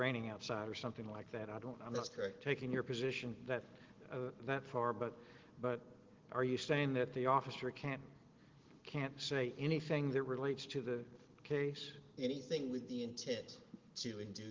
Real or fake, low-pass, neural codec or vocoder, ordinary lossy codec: real; 7.2 kHz; none; Opus, 16 kbps